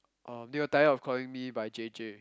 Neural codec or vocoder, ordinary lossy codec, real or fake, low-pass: none; none; real; none